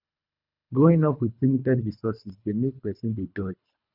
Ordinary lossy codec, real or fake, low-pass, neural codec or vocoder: none; fake; 5.4 kHz; codec, 24 kHz, 3 kbps, HILCodec